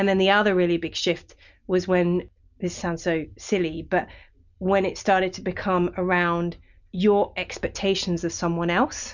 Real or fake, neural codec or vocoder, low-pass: real; none; 7.2 kHz